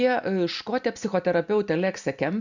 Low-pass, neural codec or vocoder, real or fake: 7.2 kHz; none; real